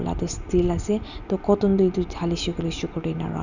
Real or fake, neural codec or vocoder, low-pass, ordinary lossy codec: real; none; 7.2 kHz; none